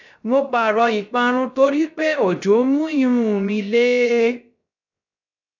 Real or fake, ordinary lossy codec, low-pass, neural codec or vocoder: fake; none; 7.2 kHz; codec, 16 kHz, 0.3 kbps, FocalCodec